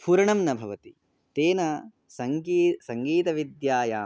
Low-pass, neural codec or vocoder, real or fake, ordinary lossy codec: none; none; real; none